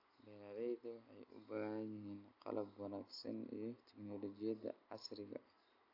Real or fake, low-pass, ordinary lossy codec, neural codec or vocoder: real; 5.4 kHz; AAC, 32 kbps; none